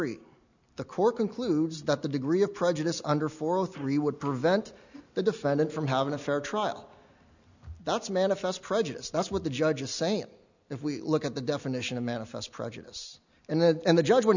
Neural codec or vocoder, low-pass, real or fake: none; 7.2 kHz; real